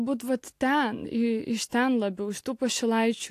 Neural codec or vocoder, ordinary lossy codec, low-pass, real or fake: none; AAC, 64 kbps; 14.4 kHz; real